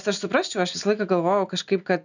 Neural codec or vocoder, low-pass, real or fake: none; 7.2 kHz; real